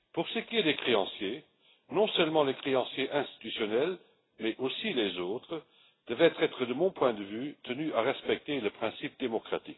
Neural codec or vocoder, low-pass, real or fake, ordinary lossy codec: none; 7.2 kHz; real; AAC, 16 kbps